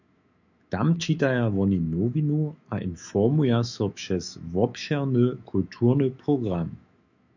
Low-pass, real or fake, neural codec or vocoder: 7.2 kHz; fake; autoencoder, 48 kHz, 128 numbers a frame, DAC-VAE, trained on Japanese speech